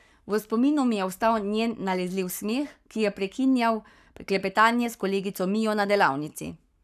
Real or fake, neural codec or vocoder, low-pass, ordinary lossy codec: fake; codec, 44.1 kHz, 7.8 kbps, Pupu-Codec; 14.4 kHz; none